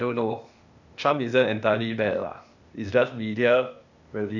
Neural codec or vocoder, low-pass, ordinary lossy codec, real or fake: codec, 16 kHz, 0.8 kbps, ZipCodec; 7.2 kHz; none; fake